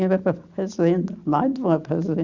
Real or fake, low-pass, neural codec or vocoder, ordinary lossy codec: real; 7.2 kHz; none; Opus, 64 kbps